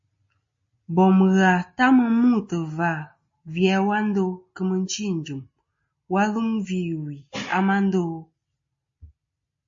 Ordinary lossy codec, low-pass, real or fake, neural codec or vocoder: MP3, 32 kbps; 7.2 kHz; real; none